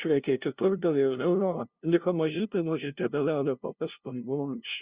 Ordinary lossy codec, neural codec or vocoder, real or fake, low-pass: Opus, 64 kbps; codec, 16 kHz, 0.5 kbps, FunCodec, trained on LibriTTS, 25 frames a second; fake; 3.6 kHz